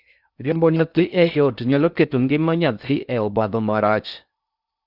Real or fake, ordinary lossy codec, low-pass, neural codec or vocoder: fake; Opus, 64 kbps; 5.4 kHz; codec, 16 kHz in and 24 kHz out, 0.6 kbps, FocalCodec, streaming, 2048 codes